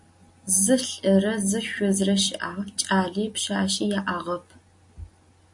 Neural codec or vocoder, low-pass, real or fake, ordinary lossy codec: none; 10.8 kHz; real; MP3, 48 kbps